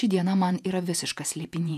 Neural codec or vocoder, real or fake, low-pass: vocoder, 48 kHz, 128 mel bands, Vocos; fake; 14.4 kHz